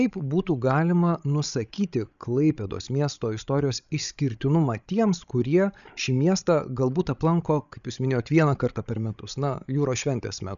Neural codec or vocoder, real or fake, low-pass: codec, 16 kHz, 16 kbps, FreqCodec, larger model; fake; 7.2 kHz